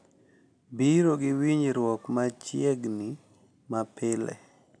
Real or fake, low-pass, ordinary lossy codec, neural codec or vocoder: real; 9.9 kHz; none; none